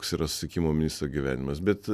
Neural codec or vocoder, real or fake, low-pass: none; real; 14.4 kHz